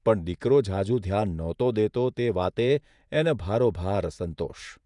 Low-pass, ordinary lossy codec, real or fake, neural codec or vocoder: 10.8 kHz; none; fake; vocoder, 48 kHz, 128 mel bands, Vocos